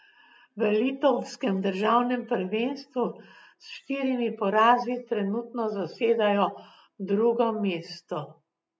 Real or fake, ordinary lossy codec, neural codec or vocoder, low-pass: real; none; none; none